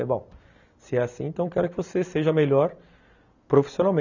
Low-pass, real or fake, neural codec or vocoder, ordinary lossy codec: 7.2 kHz; real; none; none